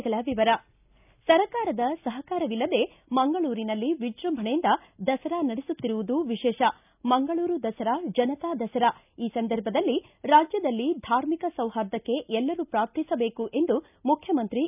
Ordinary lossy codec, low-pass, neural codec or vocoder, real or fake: none; 3.6 kHz; none; real